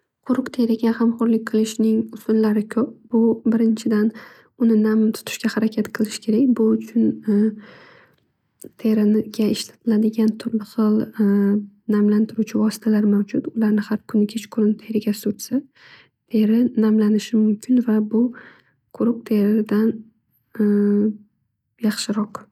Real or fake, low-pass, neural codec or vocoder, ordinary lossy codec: real; 19.8 kHz; none; none